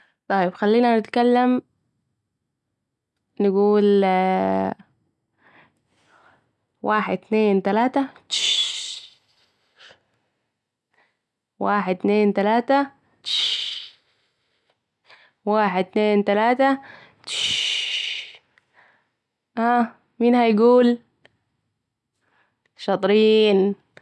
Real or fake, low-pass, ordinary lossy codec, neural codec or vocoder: real; none; none; none